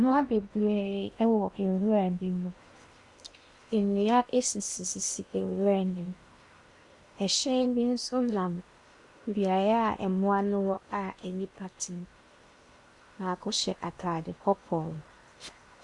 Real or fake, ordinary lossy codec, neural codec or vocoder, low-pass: fake; Opus, 64 kbps; codec, 16 kHz in and 24 kHz out, 0.8 kbps, FocalCodec, streaming, 65536 codes; 10.8 kHz